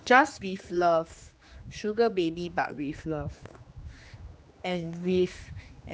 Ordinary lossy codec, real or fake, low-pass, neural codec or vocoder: none; fake; none; codec, 16 kHz, 2 kbps, X-Codec, HuBERT features, trained on general audio